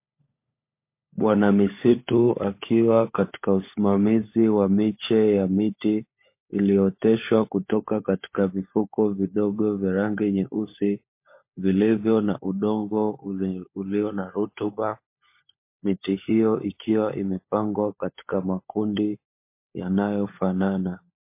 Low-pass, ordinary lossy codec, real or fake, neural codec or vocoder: 3.6 kHz; MP3, 24 kbps; fake; codec, 16 kHz, 16 kbps, FunCodec, trained on LibriTTS, 50 frames a second